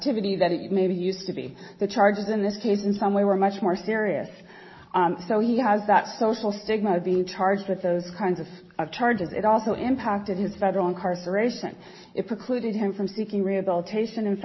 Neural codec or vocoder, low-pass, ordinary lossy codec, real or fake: none; 7.2 kHz; MP3, 24 kbps; real